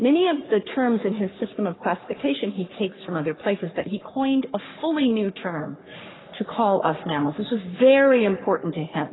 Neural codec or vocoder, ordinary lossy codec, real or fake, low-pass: codec, 44.1 kHz, 3.4 kbps, Pupu-Codec; AAC, 16 kbps; fake; 7.2 kHz